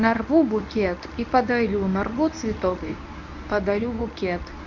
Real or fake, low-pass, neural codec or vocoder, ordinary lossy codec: fake; 7.2 kHz; codec, 24 kHz, 0.9 kbps, WavTokenizer, medium speech release version 1; none